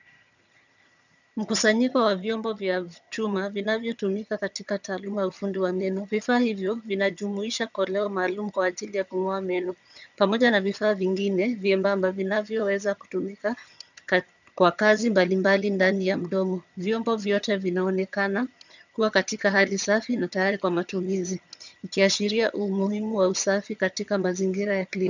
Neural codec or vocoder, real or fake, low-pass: vocoder, 22.05 kHz, 80 mel bands, HiFi-GAN; fake; 7.2 kHz